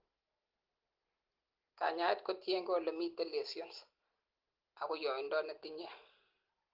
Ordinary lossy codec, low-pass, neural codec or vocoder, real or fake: Opus, 16 kbps; 5.4 kHz; none; real